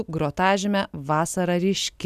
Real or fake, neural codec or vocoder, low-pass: real; none; 14.4 kHz